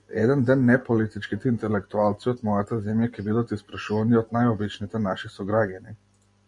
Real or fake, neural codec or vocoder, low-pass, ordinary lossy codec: real; none; 10.8 kHz; MP3, 48 kbps